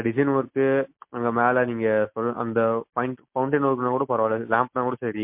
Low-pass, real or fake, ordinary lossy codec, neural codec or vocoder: 3.6 kHz; real; MP3, 32 kbps; none